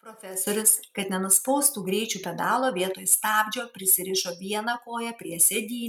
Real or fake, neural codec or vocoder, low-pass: real; none; 19.8 kHz